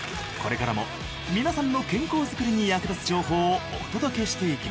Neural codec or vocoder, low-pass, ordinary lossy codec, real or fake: none; none; none; real